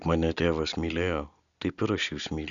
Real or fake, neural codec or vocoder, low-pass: real; none; 7.2 kHz